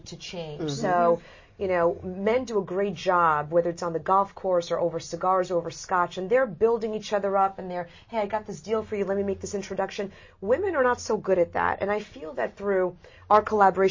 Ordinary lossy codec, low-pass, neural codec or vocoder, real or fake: MP3, 32 kbps; 7.2 kHz; none; real